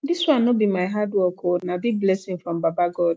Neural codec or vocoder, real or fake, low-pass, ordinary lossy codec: none; real; none; none